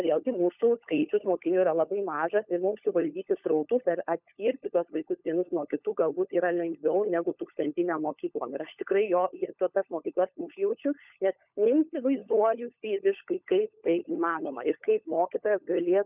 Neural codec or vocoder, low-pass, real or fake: codec, 16 kHz, 16 kbps, FunCodec, trained on LibriTTS, 50 frames a second; 3.6 kHz; fake